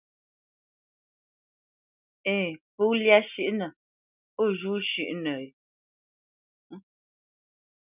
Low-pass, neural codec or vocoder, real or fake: 3.6 kHz; none; real